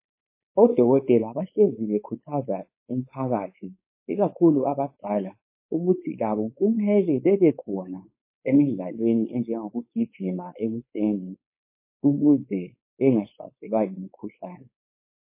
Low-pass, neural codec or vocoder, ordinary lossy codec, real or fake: 3.6 kHz; codec, 16 kHz, 4.8 kbps, FACodec; MP3, 24 kbps; fake